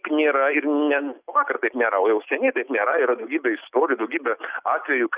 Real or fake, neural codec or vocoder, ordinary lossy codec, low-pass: real; none; Opus, 64 kbps; 3.6 kHz